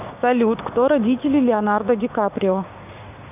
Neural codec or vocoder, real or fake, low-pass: codec, 16 kHz, 6 kbps, DAC; fake; 3.6 kHz